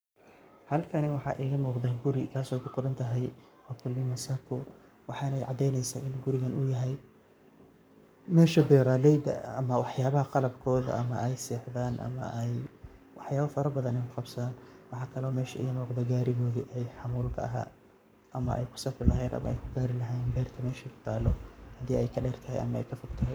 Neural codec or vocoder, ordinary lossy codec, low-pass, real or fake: codec, 44.1 kHz, 7.8 kbps, Pupu-Codec; none; none; fake